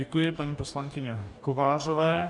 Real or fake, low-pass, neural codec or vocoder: fake; 10.8 kHz; codec, 44.1 kHz, 2.6 kbps, DAC